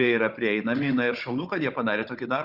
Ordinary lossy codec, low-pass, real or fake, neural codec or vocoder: Opus, 64 kbps; 5.4 kHz; fake; codec, 16 kHz, 16 kbps, FunCodec, trained on Chinese and English, 50 frames a second